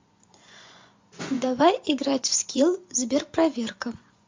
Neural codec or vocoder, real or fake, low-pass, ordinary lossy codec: vocoder, 24 kHz, 100 mel bands, Vocos; fake; 7.2 kHz; MP3, 48 kbps